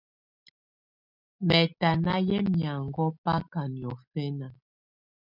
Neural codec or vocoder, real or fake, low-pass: none; real; 5.4 kHz